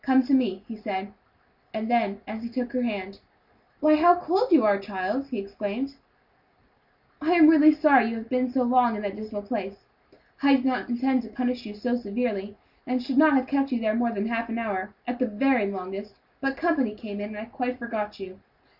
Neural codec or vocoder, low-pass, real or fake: none; 5.4 kHz; real